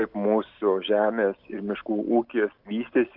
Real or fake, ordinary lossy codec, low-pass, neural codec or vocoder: real; Opus, 24 kbps; 5.4 kHz; none